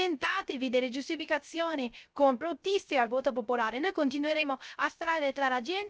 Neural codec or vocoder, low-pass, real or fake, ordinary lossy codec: codec, 16 kHz, 0.3 kbps, FocalCodec; none; fake; none